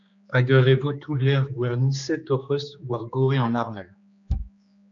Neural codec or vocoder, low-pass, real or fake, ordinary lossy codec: codec, 16 kHz, 2 kbps, X-Codec, HuBERT features, trained on general audio; 7.2 kHz; fake; AAC, 64 kbps